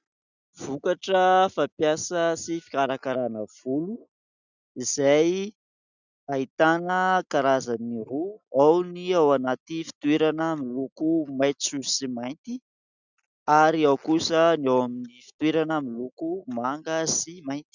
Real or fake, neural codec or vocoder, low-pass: real; none; 7.2 kHz